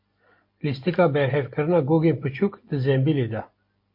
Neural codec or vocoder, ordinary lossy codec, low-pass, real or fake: none; MP3, 32 kbps; 5.4 kHz; real